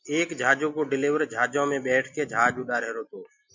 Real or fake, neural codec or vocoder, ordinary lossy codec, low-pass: real; none; MP3, 48 kbps; 7.2 kHz